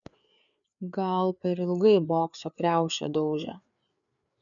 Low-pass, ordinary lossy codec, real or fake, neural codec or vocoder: 7.2 kHz; MP3, 96 kbps; fake; codec, 16 kHz, 4 kbps, FreqCodec, larger model